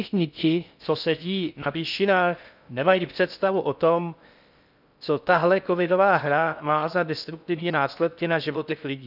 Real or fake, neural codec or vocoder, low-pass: fake; codec, 16 kHz in and 24 kHz out, 0.6 kbps, FocalCodec, streaming, 4096 codes; 5.4 kHz